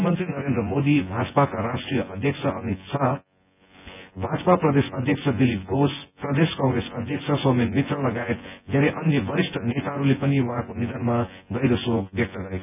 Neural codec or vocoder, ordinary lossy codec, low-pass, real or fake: vocoder, 24 kHz, 100 mel bands, Vocos; none; 3.6 kHz; fake